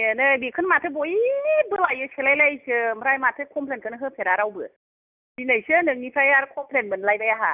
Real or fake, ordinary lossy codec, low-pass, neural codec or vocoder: real; none; 3.6 kHz; none